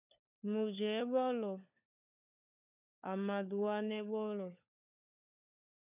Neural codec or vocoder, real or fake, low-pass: codec, 16 kHz, 4 kbps, FunCodec, trained on LibriTTS, 50 frames a second; fake; 3.6 kHz